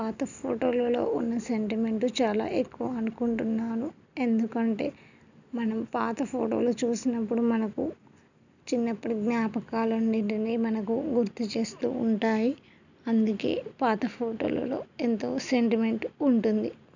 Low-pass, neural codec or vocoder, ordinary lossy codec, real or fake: 7.2 kHz; none; none; real